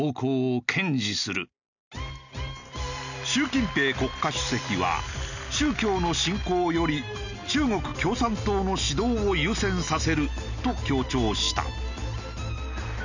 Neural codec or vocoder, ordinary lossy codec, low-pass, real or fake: none; none; 7.2 kHz; real